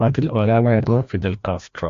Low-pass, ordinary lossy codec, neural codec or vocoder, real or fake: 7.2 kHz; AAC, 64 kbps; codec, 16 kHz, 1 kbps, FreqCodec, larger model; fake